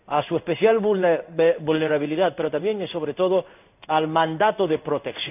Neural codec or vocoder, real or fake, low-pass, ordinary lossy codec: codec, 16 kHz in and 24 kHz out, 1 kbps, XY-Tokenizer; fake; 3.6 kHz; none